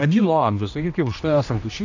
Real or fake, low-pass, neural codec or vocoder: fake; 7.2 kHz; codec, 16 kHz, 1 kbps, X-Codec, HuBERT features, trained on general audio